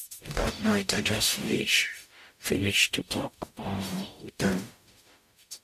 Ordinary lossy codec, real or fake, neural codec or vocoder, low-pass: AAC, 96 kbps; fake; codec, 44.1 kHz, 0.9 kbps, DAC; 14.4 kHz